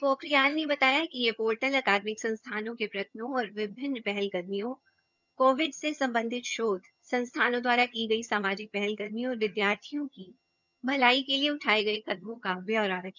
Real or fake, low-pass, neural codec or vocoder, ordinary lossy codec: fake; 7.2 kHz; vocoder, 22.05 kHz, 80 mel bands, HiFi-GAN; none